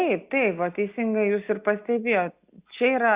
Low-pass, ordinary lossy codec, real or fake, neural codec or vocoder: 3.6 kHz; Opus, 64 kbps; real; none